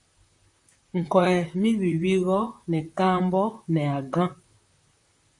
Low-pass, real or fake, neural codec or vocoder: 10.8 kHz; fake; vocoder, 44.1 kHz, 128 mel bands, Pupu-Vocoder